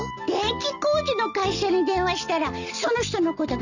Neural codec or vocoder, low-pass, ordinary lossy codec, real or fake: none; 7.2 kHz; none; real